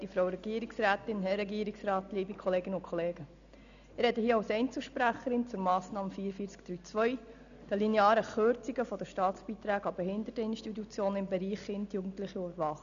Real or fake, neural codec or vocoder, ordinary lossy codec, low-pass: real; none; none; 7.2 kHz